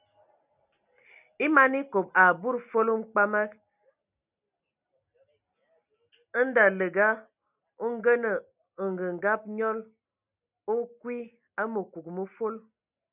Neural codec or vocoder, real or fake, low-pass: none; real; 3.6 kHz